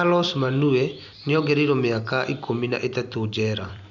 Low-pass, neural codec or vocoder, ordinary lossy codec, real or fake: 7.2 kHz; vocoder, 44.1 kHz, 128 mel bands every 256 samples, BigVGAN v2; none; fake